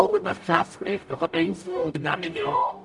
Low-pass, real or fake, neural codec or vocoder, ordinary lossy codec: 10.8 kHz; fake; codec, 44.1 kHz, 0.9 kbps, DAC; none